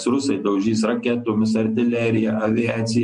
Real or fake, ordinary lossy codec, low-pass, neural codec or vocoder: real; MP3, 48 kbps; 9.9 kHz; none